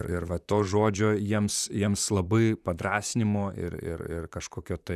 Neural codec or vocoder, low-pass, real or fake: vocoder, 44.1 kHz, 128 mel bands, Pupu-Vocoder; 14.4 kHz; fake